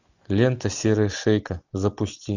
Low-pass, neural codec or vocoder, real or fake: 7.2 kHz; none; real